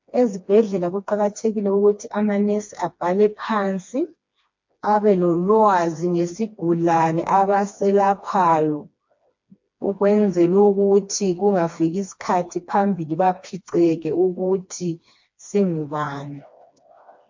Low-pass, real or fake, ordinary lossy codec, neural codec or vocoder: 7.2 kHz; fake; MP3, 48 kbps; codec, 16 kHz, 2 kbps, FreqCodec, smaller model